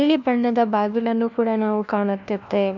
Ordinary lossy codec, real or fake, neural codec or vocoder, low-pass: none; fake; codec, 16 kHz, 1 kbps, FunCodec, trained on LibriTTS, 50 frames a second; 7.2 kHz